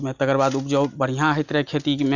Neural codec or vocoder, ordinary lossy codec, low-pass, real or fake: none; none; 7.2 kHz; real